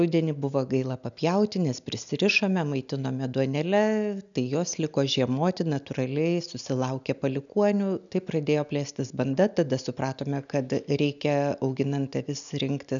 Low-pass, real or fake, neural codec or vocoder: 7.2 kHz; real; none